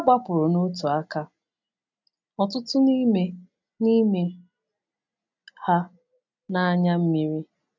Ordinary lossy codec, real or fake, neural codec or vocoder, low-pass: none; real; none; 7.2 kHz